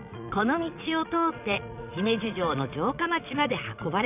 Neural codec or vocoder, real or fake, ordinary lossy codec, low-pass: vocoder, 22.05 kHz, 80 mel bands, Vocos; fake; none; 3.6 kHz